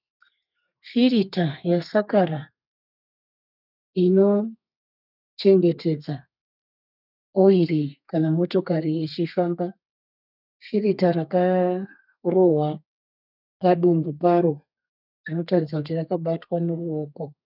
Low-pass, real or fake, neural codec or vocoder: 5.4 kHz; fake; codec, 32 kHz, 1.9 kbps, SNAC